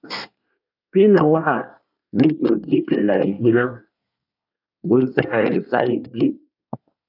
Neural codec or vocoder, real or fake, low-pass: codec, 24 kHz, 1 kbps, SNAC; fake; 5.4 kHz